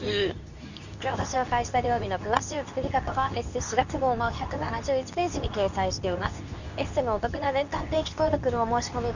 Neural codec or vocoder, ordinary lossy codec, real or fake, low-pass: codec, 24 kHz, 0.9 kbps, WavTokenizer, medium speech release version 2; none; fake; 7.2 kHz